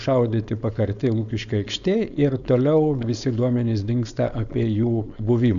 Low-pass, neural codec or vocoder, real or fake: 7.2 kHz; codec, 16 kHz, 4.8 kbps, FACodec; fake